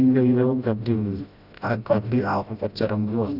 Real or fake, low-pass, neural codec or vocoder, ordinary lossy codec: fake; 5.4 kHz; codec, 16 kHz, 0.5 kbps, FreqCodec, smaller model; none